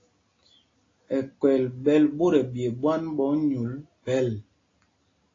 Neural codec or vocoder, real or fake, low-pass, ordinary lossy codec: none; real; 7.2 kHz; AAC, 32 kbps